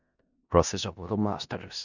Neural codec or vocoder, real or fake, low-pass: codec, 16 kHz in and 24 kHz out, 0.4 kbps, LongCat-Audio-Codec, four codebook decoder; fake; 7.2 kHz